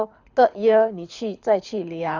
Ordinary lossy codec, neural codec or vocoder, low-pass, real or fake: none; codec, 24 kHz, 6 kbps, HILCodec; 7.2 kHz; fake